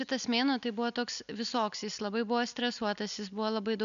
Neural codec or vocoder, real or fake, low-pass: none; real; 7.2 kHz